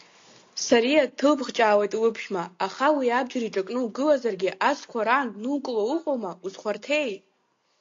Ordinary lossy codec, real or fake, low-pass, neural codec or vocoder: AAC, 48 kbps; real; 7.2 kHz; none